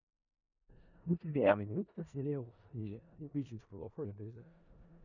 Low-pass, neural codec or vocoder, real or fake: 7.2 kHz; codec, 16 kHz in and 24 kHz out, 0.4 kbps, LongCat-Audio-Codec, four codebook decoder; fake